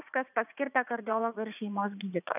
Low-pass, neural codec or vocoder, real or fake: 3.6 kHz; vocoder, 44.1 kHz, 80 mel bands, Vocos; fake